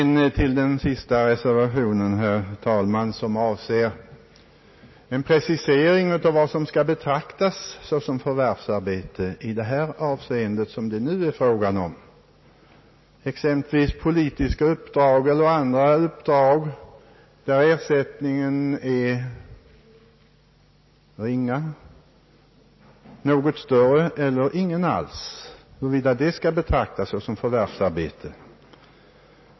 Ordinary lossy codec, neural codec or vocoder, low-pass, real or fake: MP3, 24 kbps; none; 7.2 kHz; real